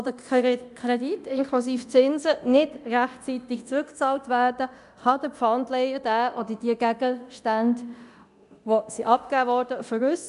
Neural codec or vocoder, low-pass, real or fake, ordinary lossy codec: codec, 24 kHz, 0.9 kbps, DualCodec; 10.8 kHz; fake; none